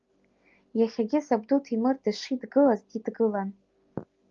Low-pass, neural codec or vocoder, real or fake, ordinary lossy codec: 7.2 kHz; none; real; Opus, 32 kbps